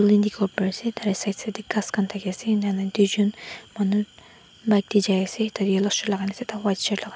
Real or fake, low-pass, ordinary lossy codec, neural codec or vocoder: real; none; none; none